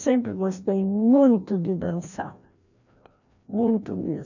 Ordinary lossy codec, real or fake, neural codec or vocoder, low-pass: none; fake; codec, 16 kHz, 1 kbps, FreqCodec, larger model; 7.2 kHz